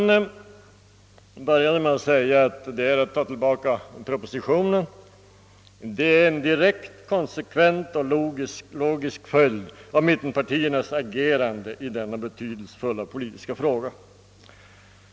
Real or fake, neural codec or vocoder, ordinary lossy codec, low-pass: real; none; none; none